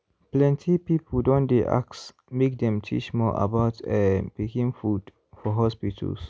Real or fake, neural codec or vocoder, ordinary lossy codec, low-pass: real; none; none; none